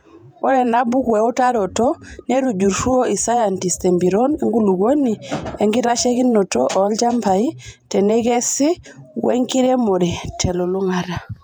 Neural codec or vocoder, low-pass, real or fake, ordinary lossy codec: vocoder, 48 kHz, 128 mel bands, Vocos; 19.8 kHz; fake; none